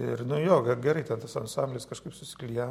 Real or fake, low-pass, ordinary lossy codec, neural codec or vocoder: real; 19.8 kHz; MP3, 64 kbps; none